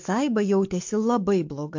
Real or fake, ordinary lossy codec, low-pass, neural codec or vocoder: fake; MP3, 48 kbps; 7.2 kHz; codec, 44.1 kHz, 7.8 kbps, DAC